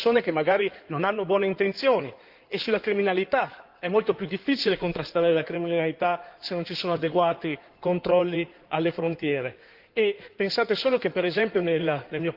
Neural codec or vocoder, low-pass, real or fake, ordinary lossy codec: codec, 16 kHz in and 24 kHz out, 2.2 kbps, FireRedTTS-2 codec; 5.4 kHz; fake; Opus, 24 kbps